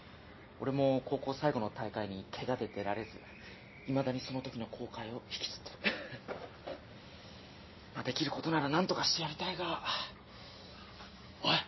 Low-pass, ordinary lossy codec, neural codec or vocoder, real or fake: 7.2 kHz; MP3, 24 kbps; none; real